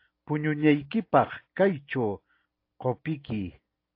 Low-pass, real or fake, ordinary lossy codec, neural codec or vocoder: 5.4 kHz; real; AAC, 32 kbps; none